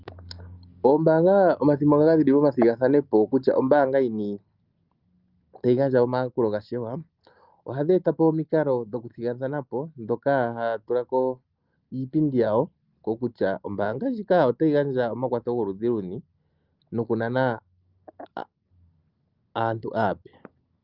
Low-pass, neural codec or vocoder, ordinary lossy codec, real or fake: 5.4 kHz; none; Opus, 32 kbps; real